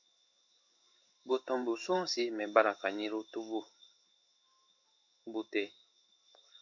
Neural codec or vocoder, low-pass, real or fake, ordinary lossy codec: codec, 16 kHz in and 24 kHz out, 1 kbps, XY-Tokenizer; 7.2 kHz; fake; MP3, 64 kbps